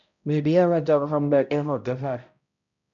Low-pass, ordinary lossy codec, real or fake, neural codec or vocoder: 7.2 kHz; MP3, 96 kbps; fake; codec, 16 kHz, 0.5 kbps, X-Codec, HuBERT features, trained on balanced general audio